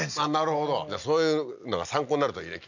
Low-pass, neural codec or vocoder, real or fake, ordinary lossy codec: 7.2 kHz; none; real; none